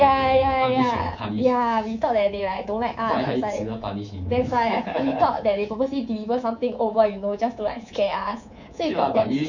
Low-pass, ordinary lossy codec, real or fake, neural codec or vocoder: 7.2 kHz; none; fake; codec, 24 kHz, 3.1 kbps, DualCodec